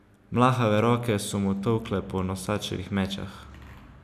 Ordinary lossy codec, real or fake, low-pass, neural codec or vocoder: none; real; 14.4 kHz; none